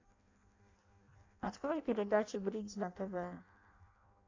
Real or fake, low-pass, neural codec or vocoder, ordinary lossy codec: fake; 7.2 kHz; codec, 16 kHz in and 24 kHz out, 0.6 kbps, FireRedTTS-2 codec; AAC, 48 kbps